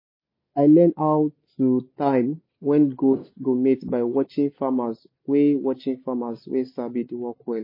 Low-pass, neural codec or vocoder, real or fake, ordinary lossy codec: 5.4 kHz; none; real; MP3, 24 kbps